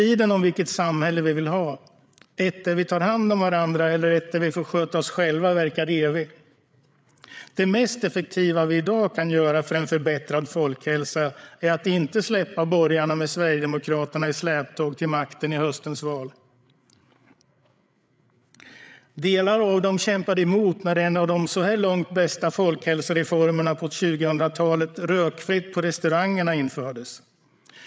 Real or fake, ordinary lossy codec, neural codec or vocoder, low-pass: fake; none; codec, 16 kHz, 8 kbps, FreqCodec, larger model; none